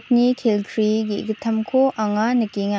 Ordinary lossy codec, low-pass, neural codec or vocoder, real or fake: none; none; none; real